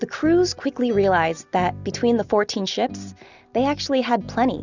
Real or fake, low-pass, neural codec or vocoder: real; 7.2 kHz; none